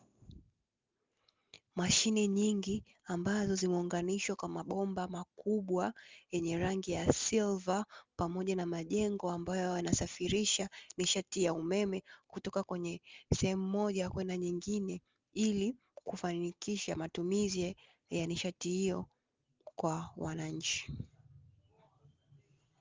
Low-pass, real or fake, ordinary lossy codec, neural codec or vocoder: 7.2 kHz; real; Opus, 32 kbps; none